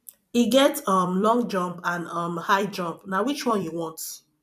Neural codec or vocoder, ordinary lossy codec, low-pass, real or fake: vocoder, 44.1 kHz, 128 mel bands every 256 samples, BigVGAN v2; none; 14.4 kHz; fake